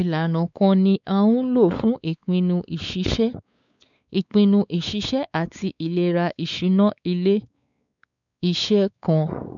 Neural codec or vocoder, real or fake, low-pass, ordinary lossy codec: codec, 16 kHz, 4 kbps, X-Codec, WavLM features, trained on Multilingual LibriSpeech; fake; 7.2 kHz; none